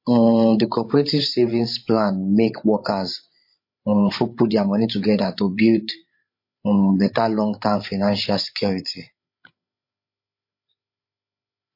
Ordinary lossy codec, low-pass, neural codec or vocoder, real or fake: MP3, 32 kbps; 5.4 kHz; codec, 16 kHz, 16 kbps, FreqCodec, larger model; fake